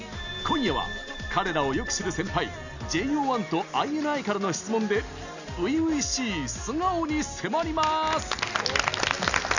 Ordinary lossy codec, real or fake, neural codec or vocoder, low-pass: none; real; none; 7.2 kHz